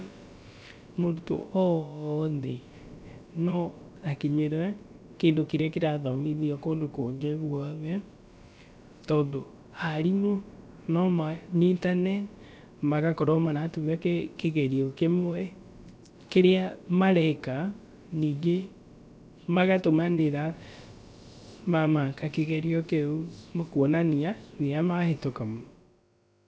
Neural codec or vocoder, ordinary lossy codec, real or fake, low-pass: codec, 16 kHz, about 1 kbps, DyCAST, with the encoder's durations; none; fake; none